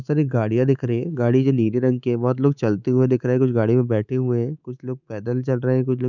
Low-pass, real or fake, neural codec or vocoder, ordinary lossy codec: 7.2 kHz; fake; codec, 24 kHz, 3.1 kbps, DualCodec; none